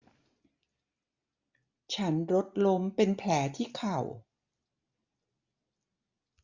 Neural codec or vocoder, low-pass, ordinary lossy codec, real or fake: none; 7.2 kHz; Opus, 64 kbps; real